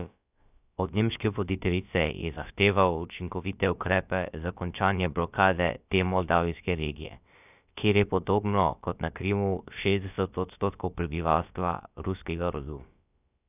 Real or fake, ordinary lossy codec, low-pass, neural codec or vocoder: fake; none; 3.6 kHz; codec, 16 kHz, about 1 kbps, DyCAST, with the encoder's durations